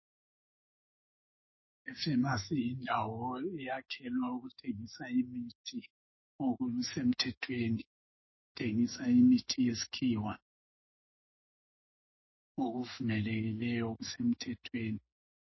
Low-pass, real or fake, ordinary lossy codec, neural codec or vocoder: 7.2 kHz; fake; MP3, 24 kbps; autoencoder, 48 kHz, 128 numbers a frame, DAC-VAE, trained on Japanese speech